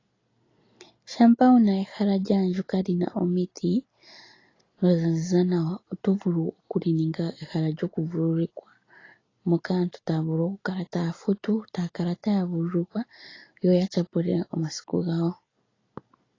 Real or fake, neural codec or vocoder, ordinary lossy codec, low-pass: real; none; AAC, 32 kbps; 7.2 kHz